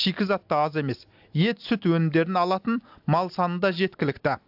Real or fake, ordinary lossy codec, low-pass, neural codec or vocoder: real; AAC, 48 kbps; 5.4 kHz; none